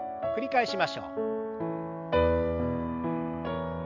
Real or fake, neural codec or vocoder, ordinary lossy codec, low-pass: real; none; none; 7.2 kHz